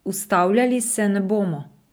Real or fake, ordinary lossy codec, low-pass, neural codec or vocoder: fake; none; none; vocoder, 44.1 kHz, 128 mel bands every 256 samples, BigVGAN v2